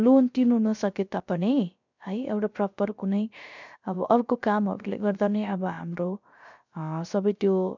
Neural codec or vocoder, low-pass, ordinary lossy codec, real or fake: codec, 16 kHz, 0.3 kbps, FocalCodec; 7.2 kHz; none; fake